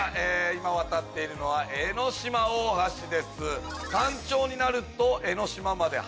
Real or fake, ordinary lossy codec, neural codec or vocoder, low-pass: real; none; none; none